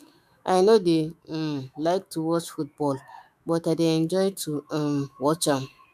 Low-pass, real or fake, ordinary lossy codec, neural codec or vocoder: 14.4 kHz; fake; none; autoencoder, 48 kHz, 128 numbers a frame, DAC-VAE, trained on Japanese speech